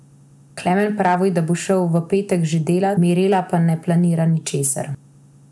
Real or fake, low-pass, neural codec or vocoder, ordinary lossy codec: real; none; none; none